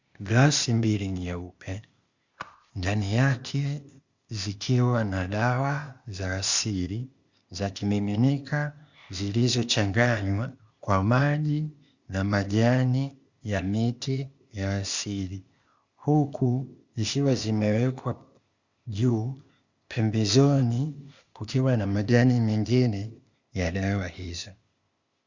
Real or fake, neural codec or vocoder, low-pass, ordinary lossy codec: fake; codec, 16 kHz, 0.8 kbps, ZipCodec; 7.2 kHz; Opus, 64 kbps